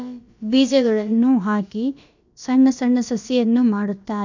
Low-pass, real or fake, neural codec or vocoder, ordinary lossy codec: 7.2 kHz; fake; codec, 16 kHz, about 1 kbps, DyCAST, with the encoder's durations; none